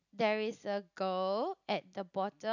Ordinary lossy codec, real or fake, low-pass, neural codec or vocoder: none; real; 7.2 kHz; none